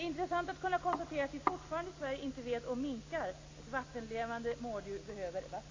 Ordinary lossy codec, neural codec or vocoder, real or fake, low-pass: AAC, 32 kbps; none; real; 7.2 kHz